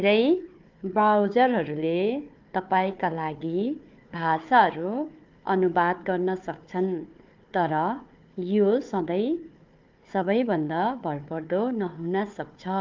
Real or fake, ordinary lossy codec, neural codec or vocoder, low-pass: fake; Opus, 32 kbps; codec, 16 kHz, 4 kbps, FunCodec, trained on Chinese and English, 50 frames a second; 7.2 kHz